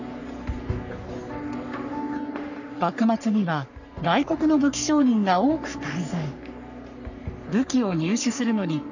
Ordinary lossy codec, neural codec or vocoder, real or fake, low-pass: none; codec, 44.1 kHz, 3.4 kbps, Pupu-Codec; fake; 7.2 kHz